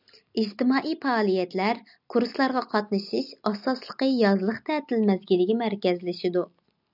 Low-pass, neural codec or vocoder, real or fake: 5.4 kHz; none; real